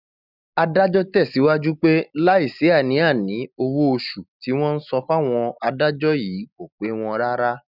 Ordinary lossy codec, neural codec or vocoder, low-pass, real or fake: none; none; 5.4 kHz; real